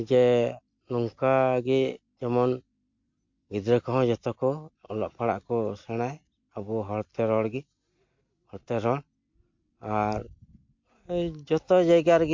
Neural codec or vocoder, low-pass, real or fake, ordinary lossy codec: none; 7.2 kHz; real; MP3, 48 kbps